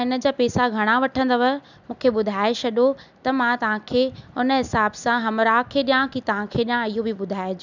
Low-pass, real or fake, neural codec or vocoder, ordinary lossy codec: 7.2 kHz; real; none; none